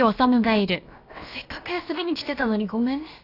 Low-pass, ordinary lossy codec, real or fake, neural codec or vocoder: 5.4 kHz; AAC, 32 kbps; fake; codec, 16 kHz, about 1 kbps, DyCAST, with the encoder's durations